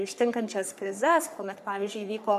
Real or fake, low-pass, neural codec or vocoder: fake; 14.4 kHz; codec, 44.1 kHz, 3.4 kbps, Pupu-Codec